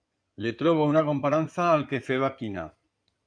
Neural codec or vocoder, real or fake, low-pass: codec, 16 kHz in and 24 kHz out, 2.2 kbps, FireRedTTS-2 codec; fake; 9.9 kHz